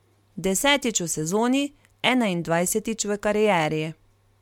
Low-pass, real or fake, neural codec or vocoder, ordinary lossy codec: 19.8 kHz; real; none; MP3, 96 kbps